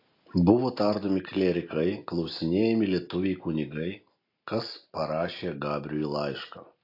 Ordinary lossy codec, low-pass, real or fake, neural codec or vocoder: AAC, 32 kbps; 5.4 kHz; real; none